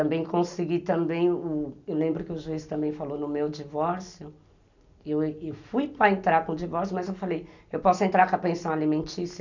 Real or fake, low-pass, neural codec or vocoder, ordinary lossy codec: real; 7.2 kHz; none; none